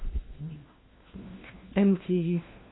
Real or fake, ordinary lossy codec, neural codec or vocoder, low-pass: fake; AAC, 16 kbps; codec, 16 kHz, 1 kbps, FunCodec, trained on LibriTTS, 50 frames a second; 7.2 kHz